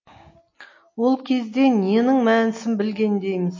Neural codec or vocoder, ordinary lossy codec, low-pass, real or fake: none; MP3, 32 kbps; 7.2 kHz; real